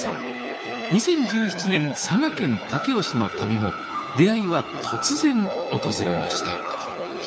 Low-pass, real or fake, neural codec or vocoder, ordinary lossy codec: none; fake; codec, 16 kHz, 4 kbps, FunCodec, trained on LibriTTS, 50 frames a second; none